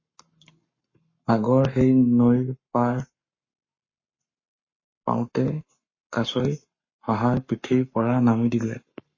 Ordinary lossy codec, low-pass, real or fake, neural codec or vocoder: MP3, 32 kbps; 7.2 kHz; fake; codec, 44.1 kHz, 7.8 kbps, Pupu-Codec